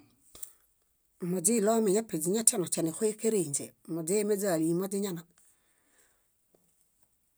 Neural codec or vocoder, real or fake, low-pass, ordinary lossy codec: none; real; none; none